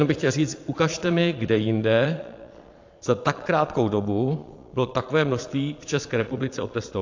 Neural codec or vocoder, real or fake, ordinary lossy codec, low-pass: vocoder, 22.05 kHz, 80 mel bands, Vocos; fake; AAC, 48 kbps; 7.2 kHz